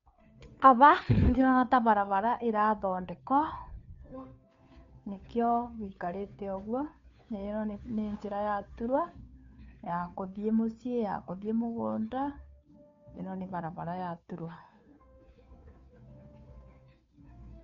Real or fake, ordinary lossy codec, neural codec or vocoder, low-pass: fake; MP3, 48 kbps; codec, 16 kHz, 2 kbps, FunCodec, trained on Chinese and English, 25 frames a second; 7.2 kHz